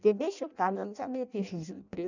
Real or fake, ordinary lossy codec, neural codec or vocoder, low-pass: fake; none; codec, 16 kHz in and 24 kHz out, 0.6 kbps, FireRedTTS-2 codec; 7.2 kHz